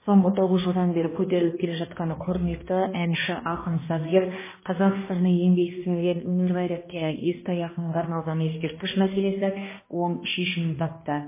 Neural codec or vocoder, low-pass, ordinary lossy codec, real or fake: codec, 16 kHz, 1 kbps, X-Codec, HuBERT features, trained on balanced general audio; 3.6 kHz; MP3, 16 kbps; fake